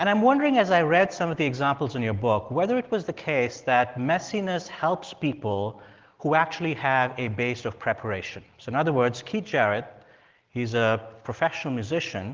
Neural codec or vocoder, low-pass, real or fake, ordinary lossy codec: none; 7.2 kHz; real; Opus, 16 kbps